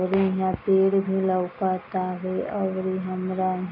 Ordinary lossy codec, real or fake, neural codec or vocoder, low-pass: none; real; none; 5.4 kHz